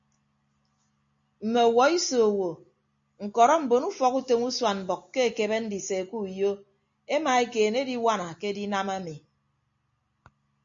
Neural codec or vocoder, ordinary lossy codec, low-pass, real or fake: none; MP3, 48 kbps; 7.2 kHz; real